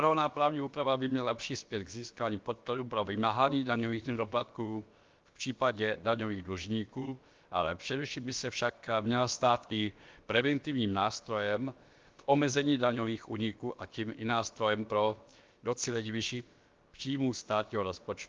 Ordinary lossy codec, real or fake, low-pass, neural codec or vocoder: Opus, 32 kbps; fake; 7.2 kHz; codec, 16 kHz, about 1 kbps, DyCAST, with the encoder's durations